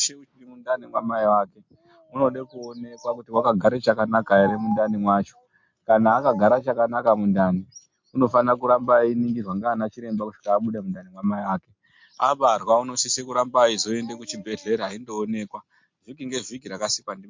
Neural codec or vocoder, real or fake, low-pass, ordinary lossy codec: none; real; 7.2 kHz; MP3, 48 kbps